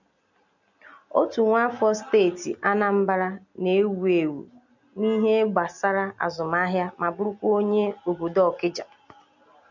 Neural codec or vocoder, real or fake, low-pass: none; real; 7.2 kHz